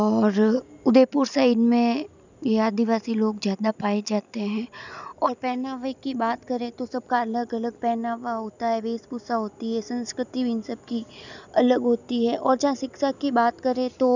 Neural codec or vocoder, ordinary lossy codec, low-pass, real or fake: none; none; 7.2 kHz; real